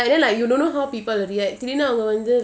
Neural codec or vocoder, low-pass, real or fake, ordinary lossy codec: none; none; real; none